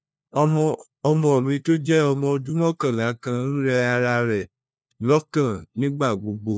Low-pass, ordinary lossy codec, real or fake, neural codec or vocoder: none; none; fake; codec, 16 kHz, 1 kbps, FunCodec, trained on LibriTTS, 50 frames a second